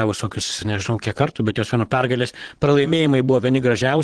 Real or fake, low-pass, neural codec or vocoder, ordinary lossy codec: fake; 9.9 kHz; vocoder, 22.05 kHz, 80 mel bands, Vocos; Opus, 16 kbps